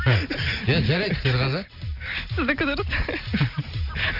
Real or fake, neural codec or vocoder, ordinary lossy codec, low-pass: real; none; none; 5.4 kHz